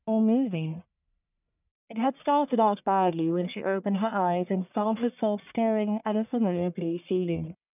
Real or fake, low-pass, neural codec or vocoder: fake; 3.6 kHz; codec, 44.1 kHz, 1.7 kbps, Pupu-Codec